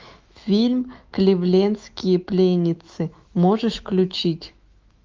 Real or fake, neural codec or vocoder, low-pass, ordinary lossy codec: fake; autoencoder, 48 kHz, 128 numbers a frame, DAC-VAE, trained on Japanese speech; 7.2 kHz; Opus, 24 kbps